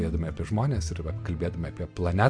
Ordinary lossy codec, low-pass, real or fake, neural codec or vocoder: MP3, 64 kbps; 9.9 kHz; real; none